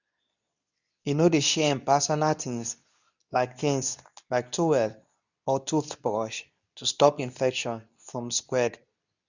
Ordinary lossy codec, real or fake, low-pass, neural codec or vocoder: none; fake; 7.2 kHz; codec, 24 kHz, 0.9 kbps, WavTokenizer, medium speech release version 2